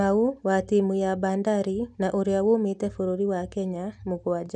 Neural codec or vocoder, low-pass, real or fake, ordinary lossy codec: none; 10.8 kHz; real; none